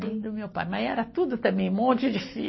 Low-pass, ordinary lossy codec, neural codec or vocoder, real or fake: 7.2 kHz; MP3, 24 kbps; none; real